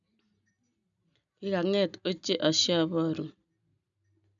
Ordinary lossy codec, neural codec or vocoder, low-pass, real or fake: none; none; 7.2 kHz; real